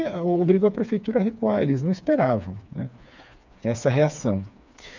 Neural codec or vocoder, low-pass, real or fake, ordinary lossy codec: codec, 16 kHz, 4 kbps, FreqCodec, smaller model; 7.2 kHz; fake; none